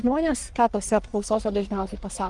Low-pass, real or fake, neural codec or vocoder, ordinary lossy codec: 10.8 kHz; fake; codec, 44.1 kHz, 1.7 kbps, Pupu-Codec; Opus, 32 kbps